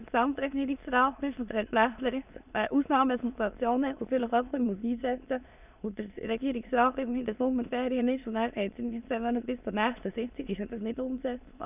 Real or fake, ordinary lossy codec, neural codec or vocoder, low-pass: fake; none; autoencoder, 22.05 kHz, a latent of 192 numbers a frame, VITS, trained on many speakers; 3.6 kHz